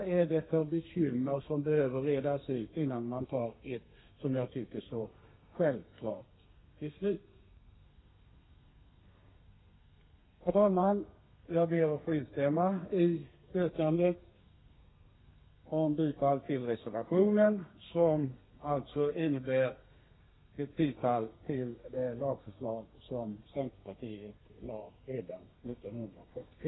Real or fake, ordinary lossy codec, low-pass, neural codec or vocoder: fake; AAC, 16 kbps; 7.2 kHz; codec, 32 kHz, 1.9 kbps, SNAC